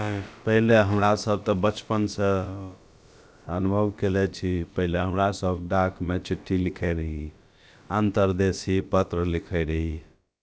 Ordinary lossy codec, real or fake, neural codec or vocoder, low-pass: none; fake; codec, 16 kHz, about 1 kbps, DyCAST, with the encoder's durations; none